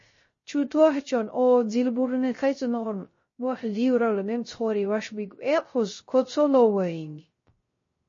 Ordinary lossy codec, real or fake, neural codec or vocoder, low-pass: MP3, 32 kbps; fake; codec, 16 kHz, 0.3 kbps, FocalCodec; 7.2 kHz